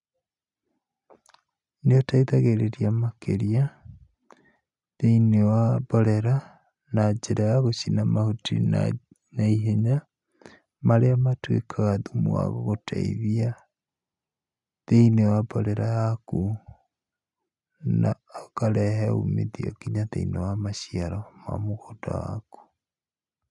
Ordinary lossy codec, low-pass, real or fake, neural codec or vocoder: none; 10.8 kHz; real; none